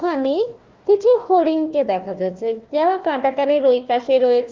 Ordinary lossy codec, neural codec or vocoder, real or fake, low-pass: Opus, 32 kbps; codec, 16 kHz, 1 kbps, FunCodec, trained on Chinese and English, 50 frames a second; fake; 7.2 kHz